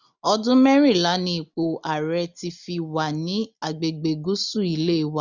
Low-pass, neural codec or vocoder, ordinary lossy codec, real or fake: 7.2 kHz; none; none; real